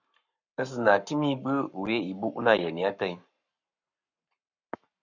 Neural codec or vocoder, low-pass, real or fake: codec, 44.1 kHz, 7.8 kbps, Pupu-Codec; 7.2 kHz; fake